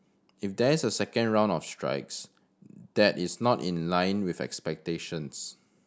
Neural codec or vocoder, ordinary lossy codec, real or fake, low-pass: none; none; real; none